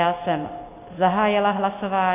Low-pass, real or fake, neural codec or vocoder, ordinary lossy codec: 3.6 kHz; real; none; AAC, 24 kbps